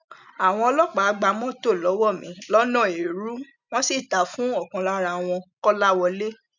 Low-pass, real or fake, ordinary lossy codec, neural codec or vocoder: 7.2 kHz; real; none; none